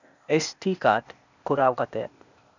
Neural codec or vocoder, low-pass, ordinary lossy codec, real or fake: codec, 16 kHz, 0.8 kbps, ZipCodec; 7.2 kHz; none; fake